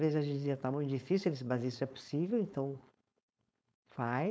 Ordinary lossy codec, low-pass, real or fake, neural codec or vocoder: none; none; fake; codec, 16 kHz, 4.8 kbps, FACodec